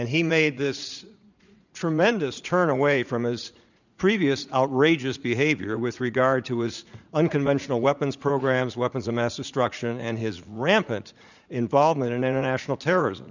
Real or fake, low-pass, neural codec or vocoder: fake; 7.2 kHz; vocoder, 44.1 kHz, 80 mel bands, Vocos